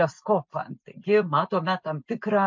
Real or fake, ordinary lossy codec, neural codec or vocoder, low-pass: real; MP3, 64 kbps; none; 7.2 kHz